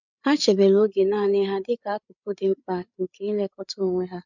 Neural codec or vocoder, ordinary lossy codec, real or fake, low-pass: codec, 16 kHz, 8 kbps, FreqCodec, larger model; none; fake; 7.2 kHz